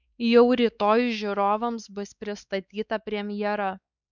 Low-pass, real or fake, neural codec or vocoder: 7.2 kHz; fake; codec, 16 kHz, 4 kbps, X-Codec, WavLM features, trained on Multilingual LibriSpeech